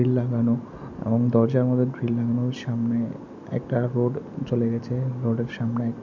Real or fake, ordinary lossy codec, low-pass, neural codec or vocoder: real; none; 7.2 kHz; none